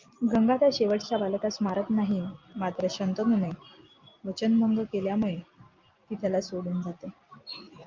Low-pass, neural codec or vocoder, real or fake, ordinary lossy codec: 7.2 kHz; none; real; Opus, 24 kbps